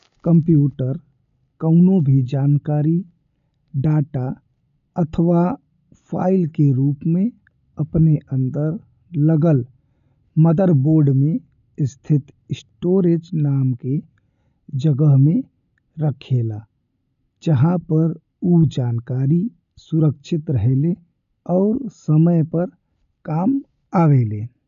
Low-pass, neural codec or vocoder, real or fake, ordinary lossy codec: 7.2 kHz; none; real; none